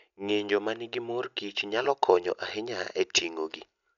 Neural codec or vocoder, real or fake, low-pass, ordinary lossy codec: none; real; 7.2 kHz; none